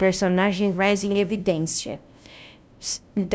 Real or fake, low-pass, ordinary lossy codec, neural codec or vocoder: fake; none; none; codec, 16 kHz, 0.5 kbps, FunCodec, trained on LibriTTS, 25 frames a second